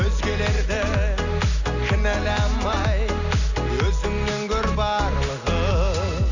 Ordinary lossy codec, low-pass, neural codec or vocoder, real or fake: none; 7.2 kHz; none; real